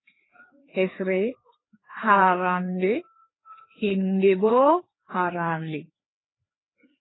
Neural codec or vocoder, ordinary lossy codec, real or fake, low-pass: codec, 16 kHz, 2 kbps, FreqCodec, larger model; AAC, 16 kbps; fake; 7.2 kHz